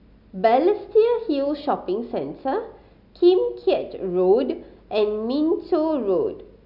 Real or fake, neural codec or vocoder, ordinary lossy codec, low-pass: real; none; none; 5.4 kHz